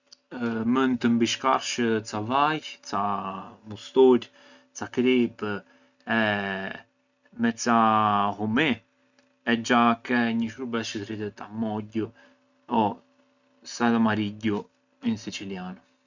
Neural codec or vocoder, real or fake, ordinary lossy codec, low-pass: none; real; none; 7.2 kHz